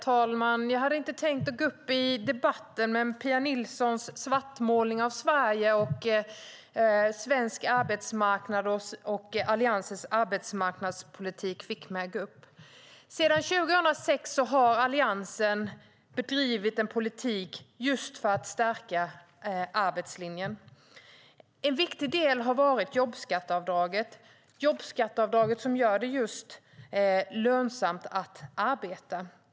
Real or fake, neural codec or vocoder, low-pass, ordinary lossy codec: real; none; none; none